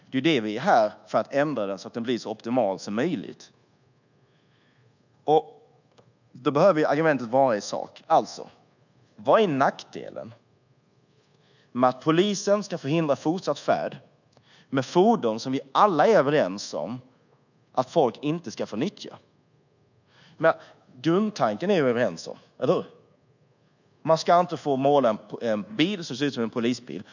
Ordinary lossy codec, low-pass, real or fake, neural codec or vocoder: none; 7.2 kHz; fake; codec, 24 kHz, 1.2 kbps, DualCodec